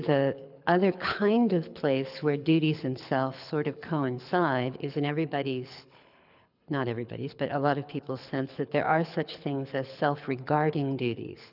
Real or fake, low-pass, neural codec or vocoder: fake; 5.4 kHz; codec, 24 kHz, 6 kbps, HILCodec